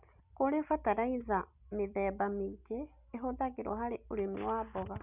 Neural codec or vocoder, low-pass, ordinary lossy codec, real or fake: none; 3.6 kHz; none; real